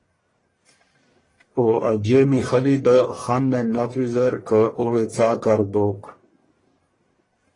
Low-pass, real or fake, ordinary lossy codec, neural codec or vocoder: 10.8 kHz; fake; AAC, 32 kbps; codec, 44.1 kHz, 1.7 kbps, Pupu-Codec